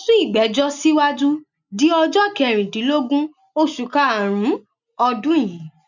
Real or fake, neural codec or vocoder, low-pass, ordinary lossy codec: real; none; 7.2 kHz; none